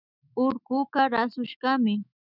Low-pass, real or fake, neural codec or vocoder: 5.4 kHz; fake; autoencoder, 48 kHz, 128 numbers a frame, DAC-VAE, trained on Japanese speech